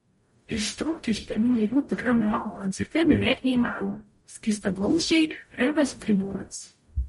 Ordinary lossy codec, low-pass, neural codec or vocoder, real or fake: MP3, 48 kbps; 19.8 kHz; codec, 44.1 kHz, 0.9 kbps, DAC; fake